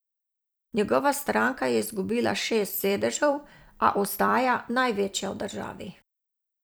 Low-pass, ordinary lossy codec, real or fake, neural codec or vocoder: none; none; fake; vocoder, 44.1 kHz, 128 mel bands every 256 samples, BigVGAN v2